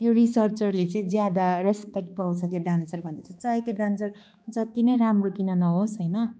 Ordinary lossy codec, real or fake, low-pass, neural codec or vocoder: none; fake; none; codec, 16 kHz, 2 kbps, X-Codec, HuBERT features, trained on balanced general audio